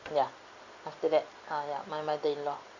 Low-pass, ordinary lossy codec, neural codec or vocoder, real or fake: 7.2 kHz; Opus, 64 kbps; none; real